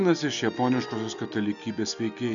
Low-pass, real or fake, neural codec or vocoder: 7.2 kHz; real; none